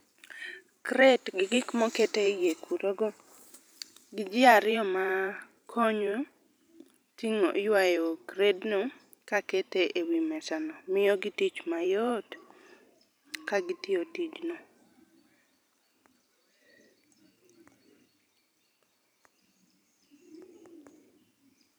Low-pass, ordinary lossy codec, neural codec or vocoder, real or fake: none; none; vocoder, 44.1 kHz, 128 mel bands every 512 samples, BigVGAN v2; fake